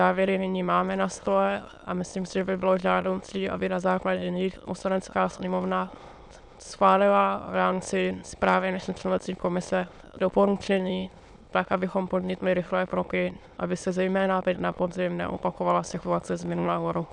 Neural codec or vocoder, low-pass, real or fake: autoencoder, 22.05 kHz, a latent of 192 numbers a frame, VITS, trained on many speakers; 9.9 kHz; fake